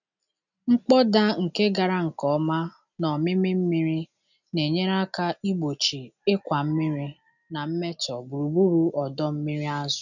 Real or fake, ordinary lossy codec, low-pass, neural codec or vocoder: real; none; 7.2 kHz; none